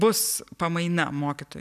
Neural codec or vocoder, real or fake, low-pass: none; real; 14.4 kHz